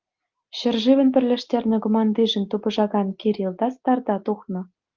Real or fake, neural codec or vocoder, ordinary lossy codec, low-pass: real; none; Opus, 32 kbps; 7.2 kHz